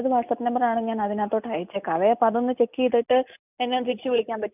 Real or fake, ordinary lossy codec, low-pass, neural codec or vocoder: real; none; 3.6 kHz; none